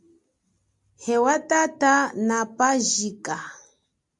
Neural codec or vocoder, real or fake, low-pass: none; real; 10.8 kHz